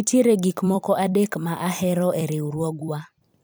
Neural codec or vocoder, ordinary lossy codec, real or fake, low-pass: vocoder, 44.1 kHz, 128 mel bands every 256 samples, BigVGAN v2; none; fake; none